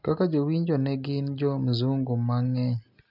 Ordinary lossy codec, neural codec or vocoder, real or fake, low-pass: none; none; real; 5.4 kHz